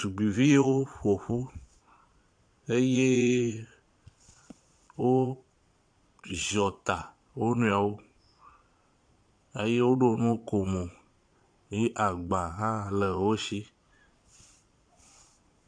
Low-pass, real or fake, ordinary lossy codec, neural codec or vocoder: 9.9 kHz; fake; AAC, 64 kbps; vocoder, 22.05 kHz, 80 mel bands, Vocos